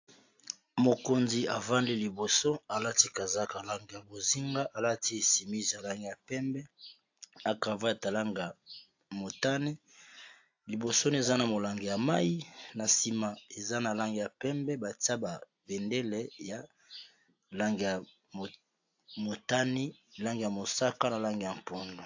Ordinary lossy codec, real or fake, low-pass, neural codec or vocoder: AAC, 48 kbps; real; 7.2 kHz; none